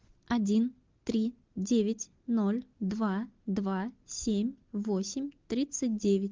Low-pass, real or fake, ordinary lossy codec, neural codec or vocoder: 7.2 kHz; real; Opus, 32 kbps; none